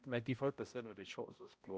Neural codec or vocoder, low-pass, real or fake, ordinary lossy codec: codec, 16 kHz, 0.5 kbps, X-Codec, HuBERT features, trained on balanced general audio; none; fake; none